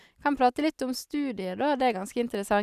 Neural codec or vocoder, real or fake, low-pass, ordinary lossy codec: none; real; 14.4 kHz; none